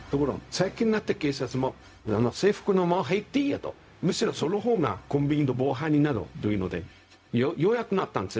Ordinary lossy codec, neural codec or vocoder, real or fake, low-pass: none; codec, 16 kHz, 0.4 kbps, LongCat-Audio-Codec; fake; none